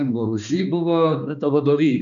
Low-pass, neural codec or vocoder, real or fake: 7.2 kHz; codec, 16 kHz, 2 kbps, X-Codec, HuBERT features, trained on balanced general audio; fake